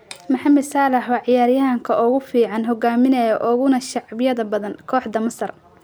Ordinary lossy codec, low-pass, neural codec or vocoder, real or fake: none; none; none; real